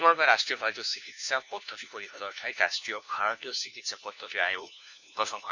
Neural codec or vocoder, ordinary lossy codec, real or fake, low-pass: codec, 16 kHz, 1 kbps, FunCodec, trained on LibriTTS, 50 frames a second; none; fake; 7.2 kHz